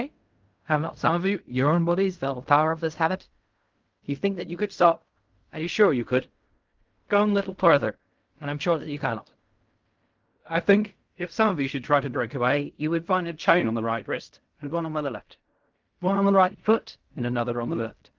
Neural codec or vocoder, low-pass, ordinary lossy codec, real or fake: codec, 16 kHz in and 24 kHz out, 0.4 kbps, LongCat-Audio-Codec, fine tuned four codebook decoder; 7.2 kHz; Opus, 32 kbps; fake